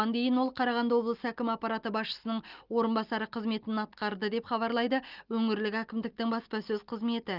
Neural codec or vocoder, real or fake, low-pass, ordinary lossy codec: none; real; 5.4 kHz; Opus, 32 kbps